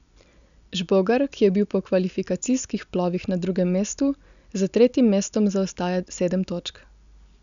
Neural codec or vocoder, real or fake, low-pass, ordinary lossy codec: none; real; 7.2 kHz; none